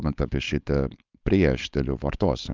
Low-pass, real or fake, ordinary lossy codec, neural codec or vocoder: 7.2 kHz; fake; Opus, 24 kbps; codec, 16 kHz, 4.8 kbps, FACodec